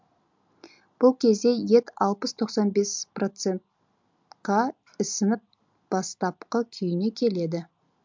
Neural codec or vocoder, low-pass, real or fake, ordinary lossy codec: none; 7.2 kHz; real; MP3, 64 kbps